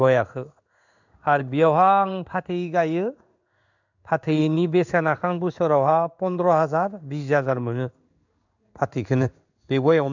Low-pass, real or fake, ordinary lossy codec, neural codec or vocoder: 7.2 kHz; fake; none; codec, 16 kHz in and 24 kHz out, 1 kbps, XY-Tokenizer